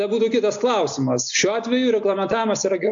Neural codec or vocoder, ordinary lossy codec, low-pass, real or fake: none; AAC, 64 kbps; 7.2 kHz; real